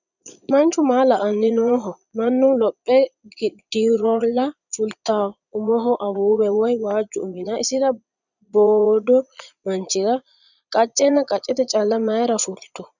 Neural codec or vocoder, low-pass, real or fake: vocoder, 22.05 kHz, 80 mel bands, Vocos; 7.2 kHz; fake